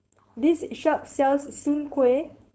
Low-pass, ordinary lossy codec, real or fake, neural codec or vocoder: none; none; fake; codec, 16 kHz, 4.8 kbps, FACodec